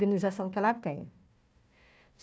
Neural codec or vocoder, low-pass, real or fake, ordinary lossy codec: codec, 16 kHz, 1 kbps, FunCodec, trained on Chinese and English, 50 frames a second; none; fake; none